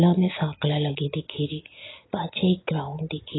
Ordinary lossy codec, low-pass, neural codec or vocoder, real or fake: AAC, 16 kbps; 7.2 kHz; none; real